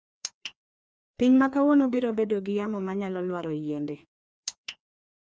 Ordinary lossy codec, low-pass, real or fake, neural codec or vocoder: none; none; fake; codec, 16 kHz, 2 kbps, FreqCodec, larger model